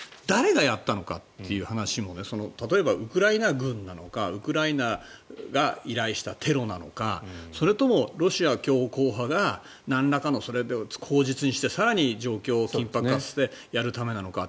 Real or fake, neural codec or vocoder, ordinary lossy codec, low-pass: real; none; none; none